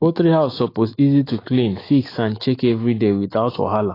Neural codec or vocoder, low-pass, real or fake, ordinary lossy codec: autoencoder, 48 kHz, 128 numbers a frame, DAC-VAE, trained on Japanese speech; 5.4 kHz; fake; AAC, 24 kbps